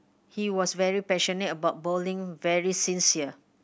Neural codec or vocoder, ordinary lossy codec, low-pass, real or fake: none; none; none; real